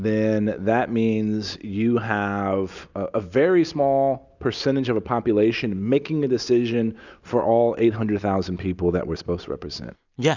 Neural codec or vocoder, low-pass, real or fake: none; 7.2 kHz; real